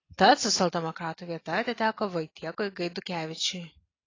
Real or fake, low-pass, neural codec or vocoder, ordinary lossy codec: real; 7.2 kHz; none; AAC, 32 kbps